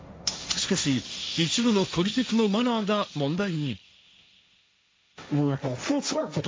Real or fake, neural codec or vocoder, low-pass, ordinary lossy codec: fake; codec, 16 kHz, 1.1 kbps, Voila-Tokenizer; none; none